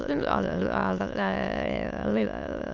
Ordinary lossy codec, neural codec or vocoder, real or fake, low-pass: Opus, 64 kbps; autoencoder, 22.05 kHz, a latent of 192 numbers a frame, VITS, trained on many speakers; fake; 7.2 kHz